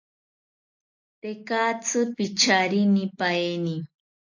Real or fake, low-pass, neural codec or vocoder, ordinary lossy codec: real; 7.2 kHz; none; AAC, 48 kbps